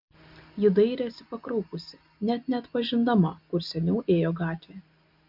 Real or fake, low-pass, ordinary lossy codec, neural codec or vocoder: real; 5.4 kHz; MP3, 48 kbps; none